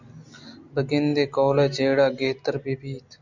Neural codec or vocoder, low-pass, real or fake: none; 7.2 kHz; real